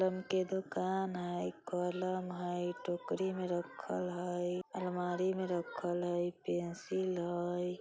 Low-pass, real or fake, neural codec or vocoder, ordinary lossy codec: none; real; none; none